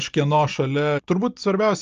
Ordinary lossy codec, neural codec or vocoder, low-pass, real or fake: Opus, 24 kbps; none; 7.2 kHz; real